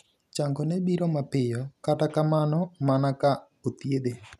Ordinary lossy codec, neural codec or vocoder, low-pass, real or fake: none; none; 14.4 kHz; real